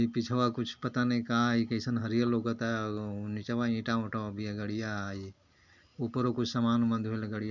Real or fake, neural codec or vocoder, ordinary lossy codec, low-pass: real; none; none; 7.2 kHz